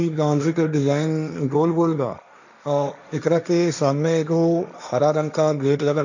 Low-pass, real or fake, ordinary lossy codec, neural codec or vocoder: 7.2 kHz; fake; none; codec, 16 kHz, 1.1 kbps, Voila-Tokenizer